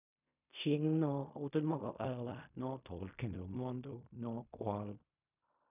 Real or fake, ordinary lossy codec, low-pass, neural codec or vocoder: fake; none; 3.6 kHz; codec, 16 kHz in and 24 kHz out, 0.4 kbps, LongCat-Audio-Codec, fine tuned four codebook decoder